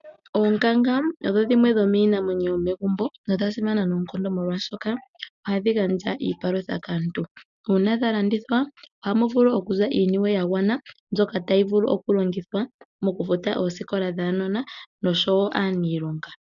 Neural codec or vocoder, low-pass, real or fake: none; 7.2 kHz; real